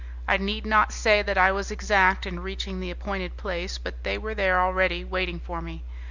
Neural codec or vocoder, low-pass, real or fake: none; 7.2 kHz; real